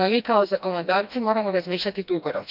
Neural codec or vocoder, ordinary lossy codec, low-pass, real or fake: codec, 16 kHz, 1 kbps, FreqCodec, smaller model; none; 5.4 kHz; fake